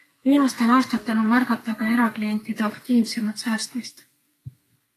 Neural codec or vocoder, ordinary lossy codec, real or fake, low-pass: codec, 32 kHz, 1.9 kbps, SNAC; AAC, 48 kbps; fake; 14.4 kHz